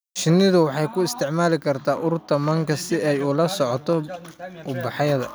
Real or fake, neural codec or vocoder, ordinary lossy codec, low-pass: real; none; none; none